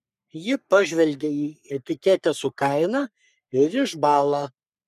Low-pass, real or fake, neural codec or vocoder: 14.4 kHz; fake; codec, 44.1 kHz, 3.4 kbps, Pupu-Codec